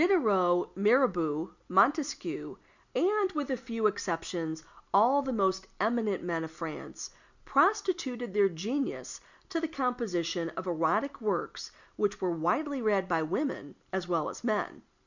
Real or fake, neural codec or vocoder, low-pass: real; none; 7.2 kHz